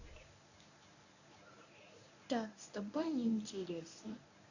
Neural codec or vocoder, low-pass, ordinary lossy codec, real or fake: codec, 24 kHz, 0.9 kbps, WavTokenizer, medium speech release version 1; 7.2 kHz; none; fake